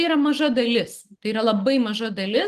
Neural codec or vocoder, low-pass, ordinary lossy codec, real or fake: none; 14.4 kHz; Opus, 24 kbps; real